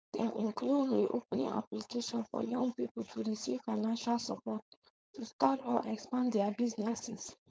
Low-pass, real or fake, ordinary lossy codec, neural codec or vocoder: none; fake; none; codec, 16 kHz, 4.8 kbps, FACodec